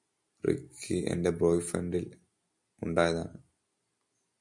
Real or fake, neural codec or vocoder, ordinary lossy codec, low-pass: real; none; MP3, 96 kbps; 10.8 kHz